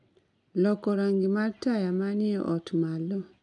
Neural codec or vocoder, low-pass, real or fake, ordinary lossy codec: none; 10.8 kHz; real; none